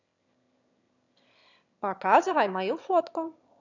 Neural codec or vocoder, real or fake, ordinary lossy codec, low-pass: autoencoder, 22.05 kHz, a latent of 192 numbers a frame, VITS, trained on one speaker; fake; none; 7.2 kHz